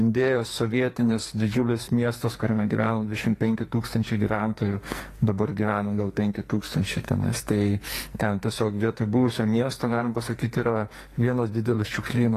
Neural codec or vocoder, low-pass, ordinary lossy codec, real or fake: codec, 44.1 kHz, 2.6 kbps, SNAC; 14.4 kHz; AAC, 48 kbps; fake